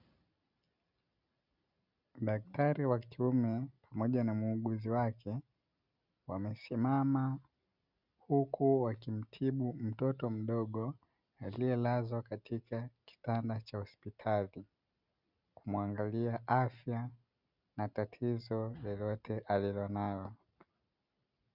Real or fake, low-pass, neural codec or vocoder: real; 5.4 kHz; none